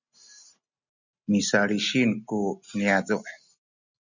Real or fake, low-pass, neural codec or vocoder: real; 7.2 kHz; none